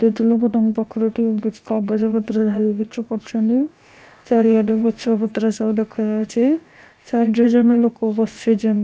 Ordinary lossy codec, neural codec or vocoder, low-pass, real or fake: none; codec, 16 kHz, about 1 kbps, DyCAST, with the encoder's durations; none; fake